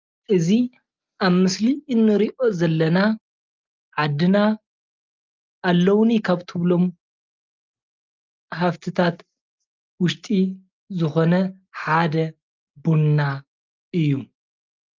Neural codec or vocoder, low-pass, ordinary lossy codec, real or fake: none; 7.2 kHz; Opus, 32 kbps; real